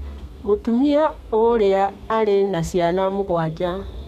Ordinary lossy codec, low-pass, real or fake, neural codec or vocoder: none; 14.4 kHz; fake; codec, 32 kHz, 1.9 kbps, SNAC